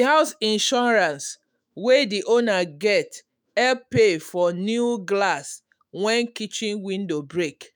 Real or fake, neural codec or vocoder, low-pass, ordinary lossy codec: fake; autoencoder, 48 kHz, 128 numbers a frame, DAC-VAE, trained on Japanese speech; none; none